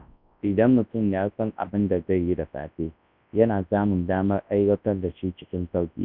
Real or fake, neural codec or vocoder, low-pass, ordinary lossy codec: fake; codec, 24 kHz, 0.9 kbps, WavTokenizer, large speech release; 5.4 kHz; none